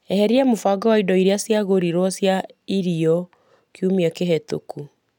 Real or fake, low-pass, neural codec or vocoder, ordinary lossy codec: real; 19.8 kHz; none; none